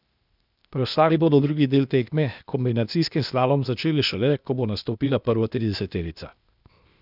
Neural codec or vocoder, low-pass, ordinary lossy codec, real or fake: codec, 16 kHz, 0.8 kbps, ZipCodec; 5.4 kHz; none; fake